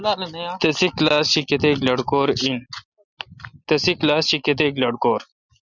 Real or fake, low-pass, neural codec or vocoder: real; 7.2 kHz; none